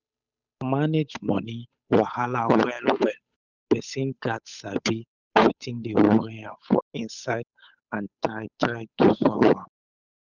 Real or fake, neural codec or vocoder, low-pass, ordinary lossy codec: fake; codec, 16 kHz, 8 kbps, FunCodec, trained on Chinese and English, 25 frames a second; 7.2 kHz; none